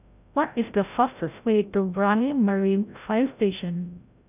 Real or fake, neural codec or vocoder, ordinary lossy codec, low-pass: fake; codec, 16 kHz, 0.5 kbps, FreqCodec, larger model; none; 3.6 kHz